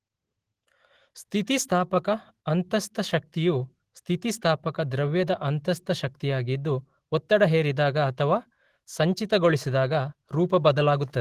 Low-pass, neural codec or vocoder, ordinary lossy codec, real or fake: 14.4 kHz; autoencoder, 48 kHz, 128 numbers a frame, DAC-VAE, trained on Japanese speech; Opus, 16 kbps; fake